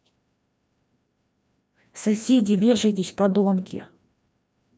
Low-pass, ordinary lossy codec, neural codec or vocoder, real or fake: none; none; codec, 16 kHz, 1 kbps, FreqCodec, larger model; fake